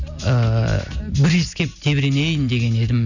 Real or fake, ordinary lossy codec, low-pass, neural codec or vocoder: real; none; 7.2 kHz; none